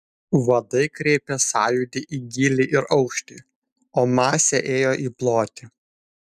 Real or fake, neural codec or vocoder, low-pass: real; none; 14.4 kHz